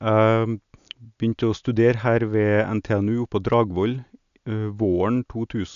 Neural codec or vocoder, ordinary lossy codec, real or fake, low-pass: none; none; real; 7.2 kHz